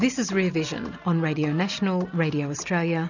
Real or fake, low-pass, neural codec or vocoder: real; 7.2 kHz; none